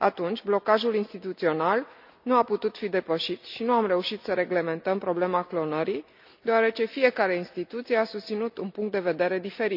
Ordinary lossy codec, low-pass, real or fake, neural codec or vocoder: none; 5.4 kHz; real; none